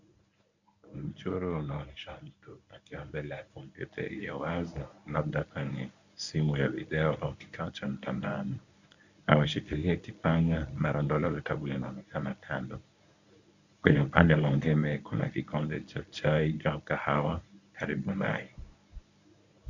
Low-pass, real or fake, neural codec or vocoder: 7.2 kHz; fake; codec, 24 kHz, 0.9 kbps, WavTokenizer, medium speech release version 1